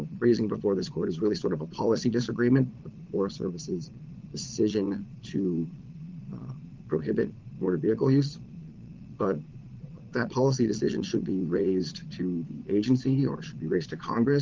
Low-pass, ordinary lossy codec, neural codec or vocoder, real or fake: 7.2 kHz; Opus, 24 kbps; vocoder, 22.05 kHz, 80 mel bands, Vocos; fake